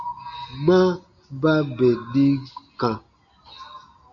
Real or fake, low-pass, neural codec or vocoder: real; 7.2 kHz; none